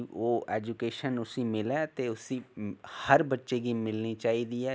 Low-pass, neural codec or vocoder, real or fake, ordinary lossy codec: none; none; real; none